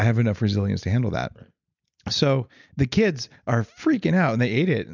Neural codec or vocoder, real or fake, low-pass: none; real; 7.2 kHz